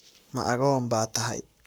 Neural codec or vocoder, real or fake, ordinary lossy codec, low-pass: codec, 44.1 kHz, 7.8 kbps, Pupu-Codec; fake; none; none